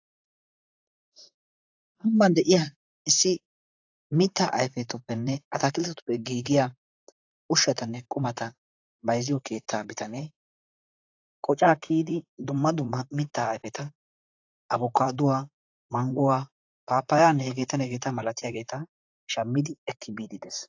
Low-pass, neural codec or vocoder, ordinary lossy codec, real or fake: 7.2 kHz; vocoder, 44.1 kHz, 128 mel bands, Pupu-Vocoder; AAC, 48 kbps; fake